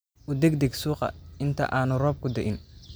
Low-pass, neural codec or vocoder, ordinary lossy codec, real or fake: none; none; none; real